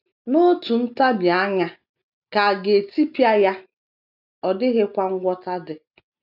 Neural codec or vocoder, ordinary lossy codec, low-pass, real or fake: none; none; 5.4 kHz; real